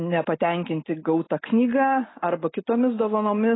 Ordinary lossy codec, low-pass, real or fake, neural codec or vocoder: AAC, 16 kbps; 7.2 kHz; real; none